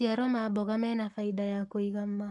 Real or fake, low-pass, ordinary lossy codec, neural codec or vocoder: fake; 10.8 kHz; none; codec, 44.1 kHz, 7.8 kbps, Pupu-Codec